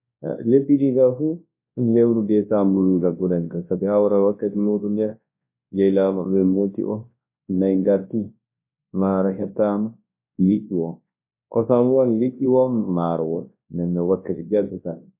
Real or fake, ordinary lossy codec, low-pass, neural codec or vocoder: fake; MP3, 24 kbps; 3.6 kHz; codec, 24 kHz, 0.9 kbps, WavTokenizer, large speech release